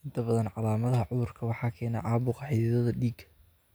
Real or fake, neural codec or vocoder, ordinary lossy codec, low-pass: real; none; none; none